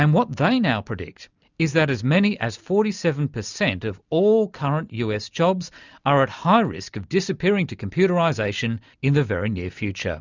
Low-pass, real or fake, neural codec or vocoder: 7.2 kHz; real; none